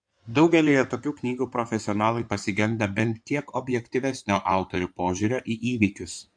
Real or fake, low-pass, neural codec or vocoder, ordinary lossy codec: fake; 9.9 kHz; codec, 16 kHz in and 24 kHz out, 2.2 kbps, FireRedTTS-2 codec; MP3, 64 kbps